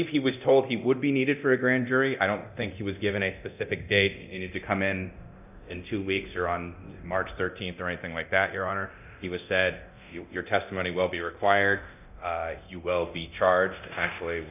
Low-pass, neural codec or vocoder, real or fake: 3.6 kHz; codec, 24 kHz, 0.9 kbps, DualCodec; fake